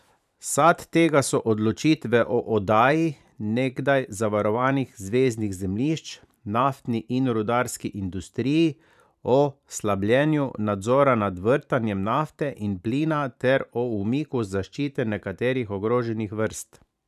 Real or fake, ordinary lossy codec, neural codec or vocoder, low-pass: fake; none; vocoder, 44.1 kHz, 128 mel bands every 512 samples, BigVGAN v2; 14.4 kHz